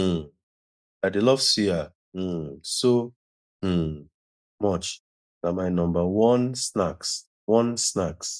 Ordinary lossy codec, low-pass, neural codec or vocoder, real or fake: none; none; none; real